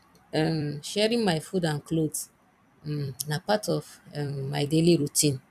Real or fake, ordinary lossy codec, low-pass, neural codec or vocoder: fake; none; 14.4 kHz; vocoder, 44.1 kHz, 128 mel bands every 256 samples, BigVGAN v2